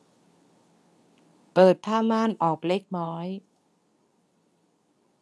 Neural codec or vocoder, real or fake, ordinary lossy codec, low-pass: codec, 24 kHz, 0.9 kbps, WavTokenizer, medium speech release version 2; fake; none; none